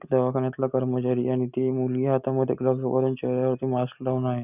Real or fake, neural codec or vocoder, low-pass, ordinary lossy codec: real; none; 3.6 kHz; none